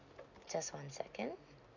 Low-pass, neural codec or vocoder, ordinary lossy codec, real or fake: 7.2 kHz; none; none; real